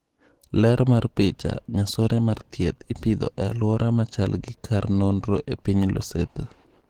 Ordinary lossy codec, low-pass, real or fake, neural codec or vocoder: Opus, 16 kbps; 19.8 kHz; fake; codec, 44.1 kHz, 7.8 kbps, DAC